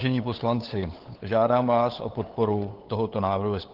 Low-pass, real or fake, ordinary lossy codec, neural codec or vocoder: 5.4 kHz; fake; Opus, 16 kbps; codec, 16 kHz, 8 kbps, FunCodec, trained on LibriTTS, 25 frames a second